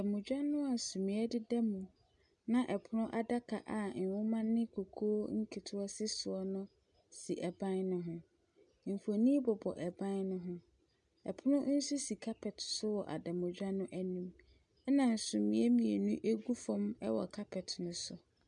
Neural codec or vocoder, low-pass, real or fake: none; 9.9 kHz; real